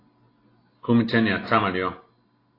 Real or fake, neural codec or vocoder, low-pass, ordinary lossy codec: real; none; 5.4 kHz; AAC, 24 kbps